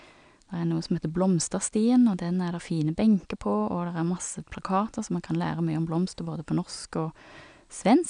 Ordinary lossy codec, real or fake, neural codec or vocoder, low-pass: none; real; none; 9.9 kHz